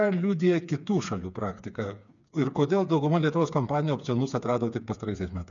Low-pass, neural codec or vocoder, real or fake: 7.2 kHz; codec, 16 kHz, 4 kbps, FreqCodec, smaller model; fake